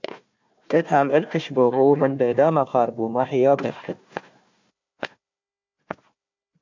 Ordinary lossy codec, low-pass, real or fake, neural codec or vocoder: MP3, 64 kbps; 7.2 kHz; fake; codec, 16 kHz, 1 kbps, FunCodec, trained on Chinese and English, 50 frames a second